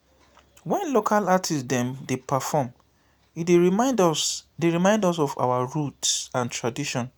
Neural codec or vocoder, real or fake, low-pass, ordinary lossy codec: none; real; none; none